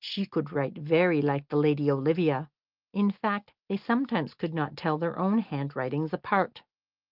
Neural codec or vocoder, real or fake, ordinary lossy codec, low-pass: none; real; Opus, 32 kbps; 5.4 kHz